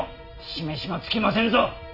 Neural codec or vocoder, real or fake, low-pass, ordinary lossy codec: none; real; 5.4 kHz; none